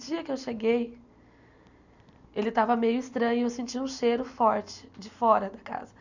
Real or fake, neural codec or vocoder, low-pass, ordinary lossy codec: real; none; 7.2 kHz; none